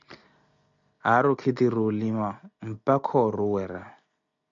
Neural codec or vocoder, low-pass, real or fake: none; 7.2 kHz; real